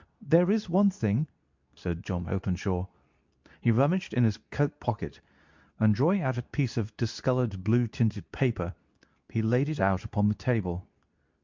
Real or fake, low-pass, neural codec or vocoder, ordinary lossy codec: fake; 7.2 kHz; codec, 24 kHz, 0.9 kbps, WavTokenizer, medium speech release version 2; MP3, 64 kbps